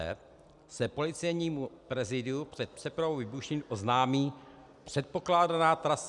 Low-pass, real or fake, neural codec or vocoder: 10.8 kHz; real; none